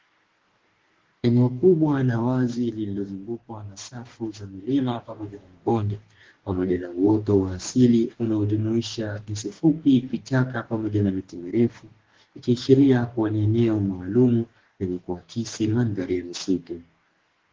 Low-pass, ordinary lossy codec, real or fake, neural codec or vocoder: 7.2 kHz; Opus, 16 kbps; fake; codec, 44.1 kHz, 2.6 kbps, DAC